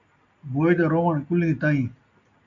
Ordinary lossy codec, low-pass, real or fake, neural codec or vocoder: MP3, 64 kbps; 7.2 kHz; real; none